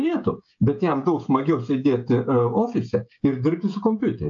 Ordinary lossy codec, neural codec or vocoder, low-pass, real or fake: AAC, 64 kbps; codec, 16 kHz, 16 kbps, FreqCodec, smaller model; 7.2 kHz; fake